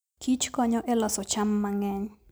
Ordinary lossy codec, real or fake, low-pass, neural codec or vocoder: none; real; none; none